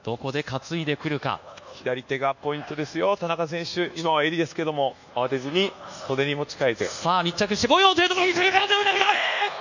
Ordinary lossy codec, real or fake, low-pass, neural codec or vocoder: none; fake; 7.2 kHz; codec, 24 kHz, 1.2 kbps, DualCodec